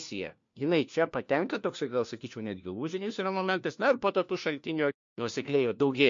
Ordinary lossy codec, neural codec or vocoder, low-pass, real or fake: MP3, 48 kbps; codec, 16 kHz, 1 kbps, FunCodec, trained on LibriTTS, 50 frames a second; 7.2 kHz; fake